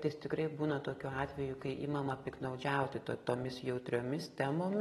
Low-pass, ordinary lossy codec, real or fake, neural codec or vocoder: 14.4 kHz; AAC, 32 kbps; real; none